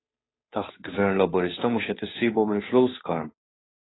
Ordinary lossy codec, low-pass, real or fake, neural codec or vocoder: AAC, 16 kbps; 7.2 kHz; fake; codec, 16 kHz, 2 kbps, FunCodec, trained on Chinese and English, 25 frames a second